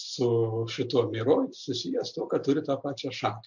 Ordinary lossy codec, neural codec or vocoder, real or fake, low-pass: MP3, 64 kbps; none; real; 7.2 kHz